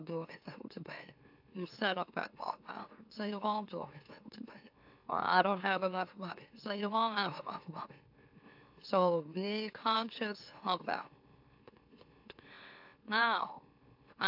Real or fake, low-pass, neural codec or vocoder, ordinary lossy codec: fake; 5.4 kHz; autoencoder, 44.1 kHz, a latent of 192 numbers a frame, MeloTTS; AAC, 48 kbps